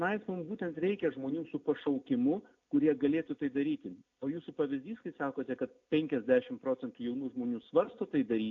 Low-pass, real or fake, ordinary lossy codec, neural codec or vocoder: 7.2 kHz; real; AAC, 48 kbps; none